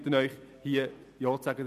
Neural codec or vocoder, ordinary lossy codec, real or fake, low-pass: none; none; real; 14.4 kHz